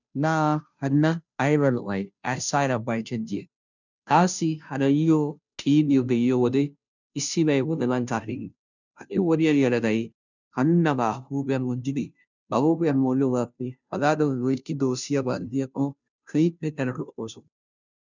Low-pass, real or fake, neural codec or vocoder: 7.2 kHz; fake; codec, 16 kHz, 0.5 kbps, FunCodec, trained on Chinese and English, 25 frames a second